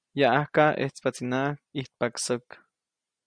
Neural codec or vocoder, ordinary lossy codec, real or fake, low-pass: none; Opus, 64 kbps; real; 9.9 kHz